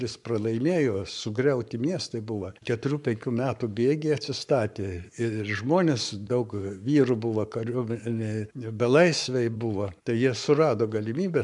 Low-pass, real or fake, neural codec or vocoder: 10.8 kHz; fake; codec, 44.1 kHz, 7.8 kbps, Pupu-Codec